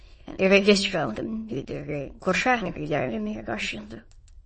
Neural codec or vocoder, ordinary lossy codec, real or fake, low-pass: autoencoder, 22.05 kHz, a latent of 192 numbers a frame, VITS, trained on many speakers; MP3, 32 kbps; fake; 9.9 kHz